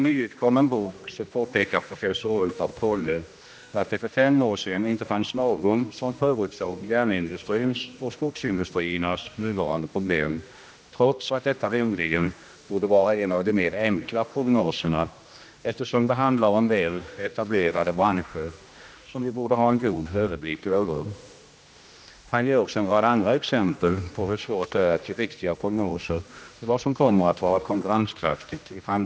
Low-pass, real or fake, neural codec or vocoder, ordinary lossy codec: none; fake; codec, 16 kHz, 1 kbps, X-Codec, HuBERT features, trained on general audio; none